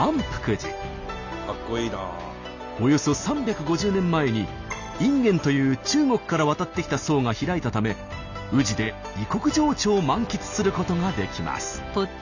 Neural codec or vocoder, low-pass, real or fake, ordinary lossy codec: none; 7.2 kHz; real; none